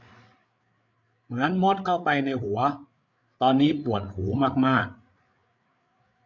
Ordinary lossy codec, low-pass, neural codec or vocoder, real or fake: MP3, 64 kbps; 7.2 kHz; codec, 16 kHz, 8 kbps, FreqCodec, larger model; fake